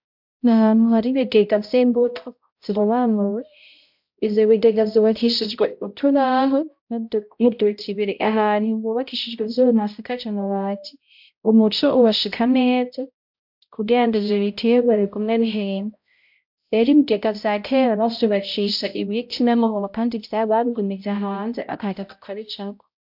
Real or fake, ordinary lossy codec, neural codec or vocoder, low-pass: fake; MP3, 48 kbps; codec, 16 kHz, 0.5 kbps, X-Codec, HuBERT features, trained on balanced general audio; 5.4 kHz